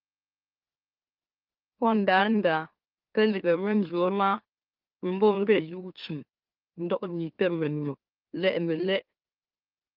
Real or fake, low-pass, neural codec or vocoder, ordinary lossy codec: fake; 5.4 kHz; autoencoder, 44.1 kHz, a latent of 192 numbers a frame, MeloTTS; Opus, 24 kbps